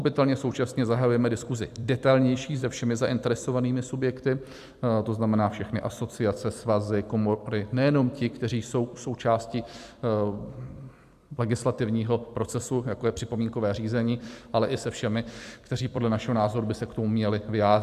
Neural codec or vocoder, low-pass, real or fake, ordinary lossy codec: vocoder, 44.1 kHz, 128 mel bands every 256 samples, BigVGAN v2; 14.4 kHz; fake; AAC, 96 kbps